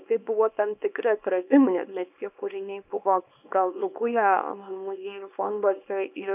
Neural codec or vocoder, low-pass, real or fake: codec, 24 kHz, 0.9 kbps, WavTokenizer, small release; 3.6 kHz; fake